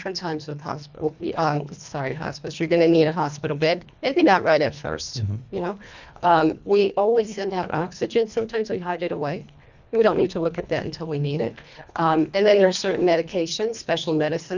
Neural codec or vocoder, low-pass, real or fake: codec, 24 kHz, 1.5 kbps, HILCodec; 7.2 kHz; fake